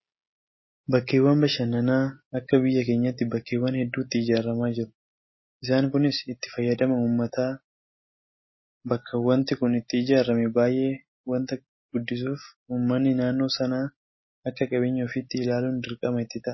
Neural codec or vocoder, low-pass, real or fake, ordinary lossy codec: none; 7.2 kHz; real; MP3, 24 kbps